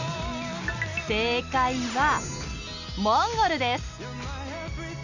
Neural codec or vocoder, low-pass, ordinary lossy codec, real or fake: none; 7.2 kHz; none; real